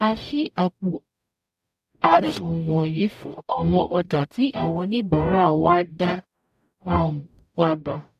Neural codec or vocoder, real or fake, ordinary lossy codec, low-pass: codec, 44.1 kHz, 0.9 kbps, DAC; fake; none; 14.4 kHz